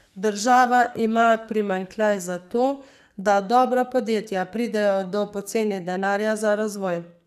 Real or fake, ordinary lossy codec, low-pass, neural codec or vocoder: fake; none; 14.4 kHz; codec, 44.1 kHz, 2.6 kbps, SNAC